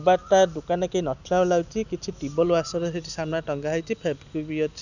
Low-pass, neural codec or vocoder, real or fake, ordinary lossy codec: 7.2 kHz; none; real; none